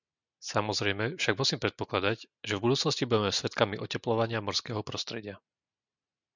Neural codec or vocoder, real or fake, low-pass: none; real; 7.2 kHz